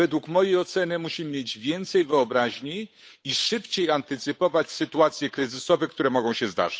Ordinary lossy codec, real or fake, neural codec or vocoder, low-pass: none; fake; codec, 16 kHz, 8 kbps, FunCodec, trained on Chinese and English, 25 frames a second; none